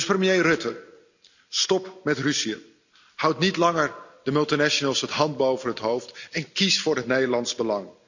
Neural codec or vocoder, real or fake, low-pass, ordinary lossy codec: none; real; 7.2 kHz; none